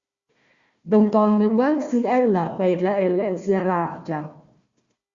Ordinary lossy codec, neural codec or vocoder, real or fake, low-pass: Opus, 64 kbps; codec, 16 kHz, 1 kbps, FunCodec, trained on Chinese and English, 50 frames a second; fake; 7.2 kHz